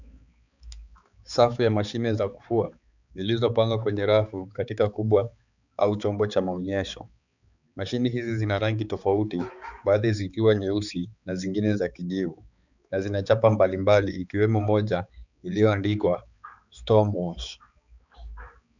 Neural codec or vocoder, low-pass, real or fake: codec, 16 kHz, 4 kbps, X-Codec, HuBERT features, trained on balanced general audio; 7.2 kHz; fake